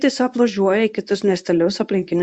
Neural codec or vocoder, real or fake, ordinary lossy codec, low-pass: codec, 24 kHz, 0.9 kbps, WavTokenizer, medium speech release version 1; fake; Opus, 64 kbps; 9.9 kHz